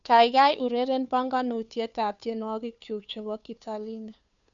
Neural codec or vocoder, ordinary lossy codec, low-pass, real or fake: codec, 16 kHz, 2 kbps, FunCodec, trained on Chinese and English, 25 frames a second; none; 7.2 kHz; fake